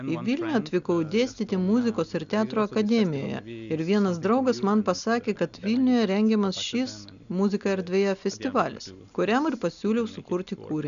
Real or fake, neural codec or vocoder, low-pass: real; none; 7.2 kHz